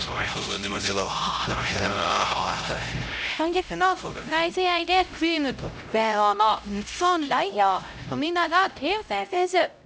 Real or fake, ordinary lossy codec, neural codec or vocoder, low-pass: fake; none; codec, 16 kHz, 0.5 kbps, X-Codec, HuBERT features, trained on LibriSpeech; none